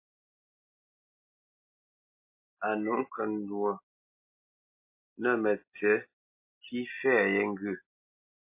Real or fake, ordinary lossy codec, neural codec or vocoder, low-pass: real; MP3, 24 kbps; none; 3.6 kHz